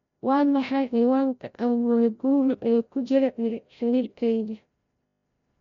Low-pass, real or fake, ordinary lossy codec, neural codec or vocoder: 7.2 kHz; fake; MP3, 64 kbps; codec, 16 kHz, 0.5 kbps, FreqCodec, larger model